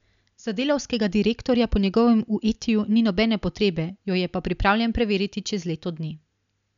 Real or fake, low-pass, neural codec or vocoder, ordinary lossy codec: real; 7.2 kHz; none; none